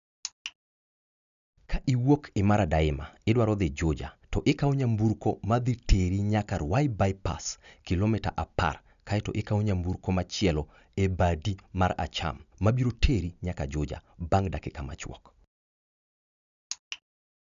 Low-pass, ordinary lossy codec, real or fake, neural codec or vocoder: 7.2 kHz; none; real; none